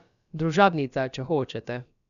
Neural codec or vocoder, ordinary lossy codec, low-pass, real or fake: codec, 16 kHz, about 1 kbps, DyCAST, with the encoder's durations; none; 7.2 kHz; fake